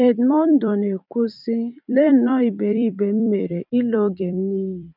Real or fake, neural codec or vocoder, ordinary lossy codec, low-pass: fake; vocoder, 44.1 kHz, 128 mel bands every 256 samples, BigVGAN v2; none; 5.4 kHz